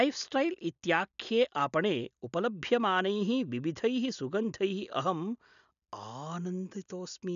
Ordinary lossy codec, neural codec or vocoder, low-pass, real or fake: none; none; 7.2 kHz; real